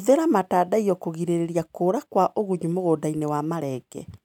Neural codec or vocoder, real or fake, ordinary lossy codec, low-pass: none; real; none; 19.8 kHz